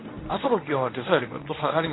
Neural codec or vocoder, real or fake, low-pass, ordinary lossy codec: codec, 24 kHz, 0.9 kbps, WavTokenizer, medium speech release version 1; fake; 7.2 kHz; AAC, 16 kbps